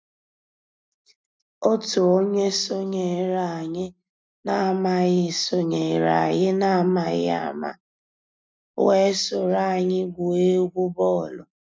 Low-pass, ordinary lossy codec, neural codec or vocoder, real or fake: none; none; none; real